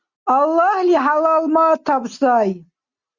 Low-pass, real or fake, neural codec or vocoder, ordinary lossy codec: 7.2 kHz; real; none; Opus, 64 kbps